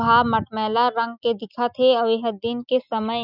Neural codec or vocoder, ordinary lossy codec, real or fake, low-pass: none; none; real; 5.4 kHz